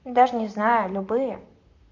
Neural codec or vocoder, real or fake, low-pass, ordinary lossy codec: vocoder, 44.1 kHz, 128 mel bands, Pupu-Vocoder; fake; 7.2 kHz; none